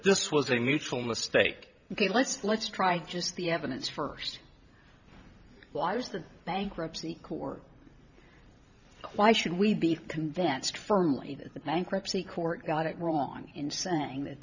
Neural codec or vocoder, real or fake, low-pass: vocoder, 44.1 kHz, 128 mel bands every 512 samples, BigVGAN v2; fake; 7.2 kHz